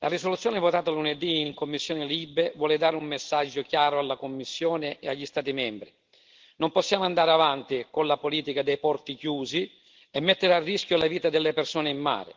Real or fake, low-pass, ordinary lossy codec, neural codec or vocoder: real; 7.2 kHz; Opus, 16 kbps; none